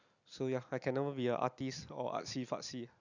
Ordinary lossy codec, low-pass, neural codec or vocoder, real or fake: none; 7.2 kHz; none; real